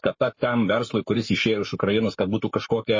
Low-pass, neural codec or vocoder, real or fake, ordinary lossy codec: 7.2 kHz; codec, 44.1 kHz, 7.8 kbps, Pupu-Codec; fake; MP3, 32 kbps